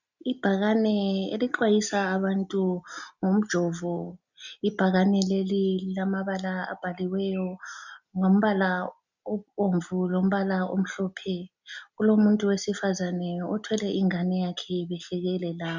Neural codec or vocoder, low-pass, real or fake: none; 7.2 kHz; real